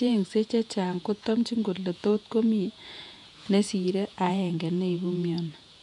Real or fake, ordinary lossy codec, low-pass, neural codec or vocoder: fake; none; 10.8 kHz; vocoder, 48 kHz, 128 mel bands, Vocos